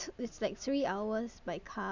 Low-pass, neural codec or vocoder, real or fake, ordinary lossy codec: 7.2 kHz; none; real; none